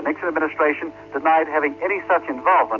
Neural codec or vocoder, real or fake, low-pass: none; real; 7.2 kHz